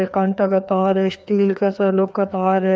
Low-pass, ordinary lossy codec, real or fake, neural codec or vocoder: none; none; fake; codec, 16 kHz, 2 kbps, FreqCodec, larger model